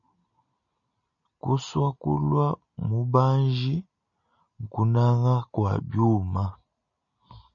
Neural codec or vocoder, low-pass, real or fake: none; 7.2 kHz; real